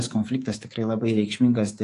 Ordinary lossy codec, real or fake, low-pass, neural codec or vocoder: AAC, 48 kbps; fake; 10.8 kHz; codec, 24 kHz, 3.1 kbps, DualCodec